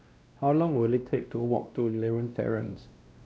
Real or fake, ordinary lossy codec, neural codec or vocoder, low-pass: fake; none; codec, 16 kHz, 1 kbps, X-Codec, WavLM features, trained on Multilingual LibriSpeech; none